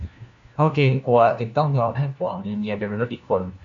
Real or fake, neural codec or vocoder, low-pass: fake; codec, 16 kHz, 1 kbps, FunCodec, trained on LibriTTS, 50 frames a second; 7.2 kHz